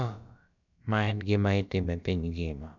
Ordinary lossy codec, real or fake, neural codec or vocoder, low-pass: none; fake; codec, 16 kHz, about 1 kbps, DyCAST, with the encoder's durations; 7.2 kHz